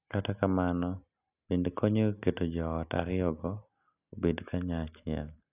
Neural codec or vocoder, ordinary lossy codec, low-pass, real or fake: none; none; 3.6 kHz; real